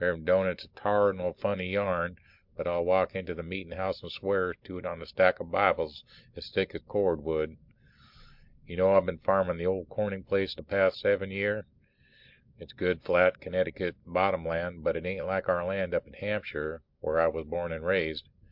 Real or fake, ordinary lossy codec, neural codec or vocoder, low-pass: real; MP3, 48 kbps; none; 5.4 kHz